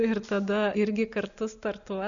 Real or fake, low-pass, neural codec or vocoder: real; 7.2 kHz; none